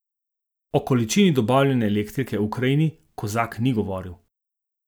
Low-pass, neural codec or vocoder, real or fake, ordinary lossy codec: none; none; real; none